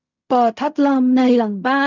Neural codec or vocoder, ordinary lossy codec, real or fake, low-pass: codec, 16 kHz in and 24 kHz out, 0.4 kbps, LongCat-Audio-Codec, fine tuned four codebook decoder; none; fake; 7.2 kHz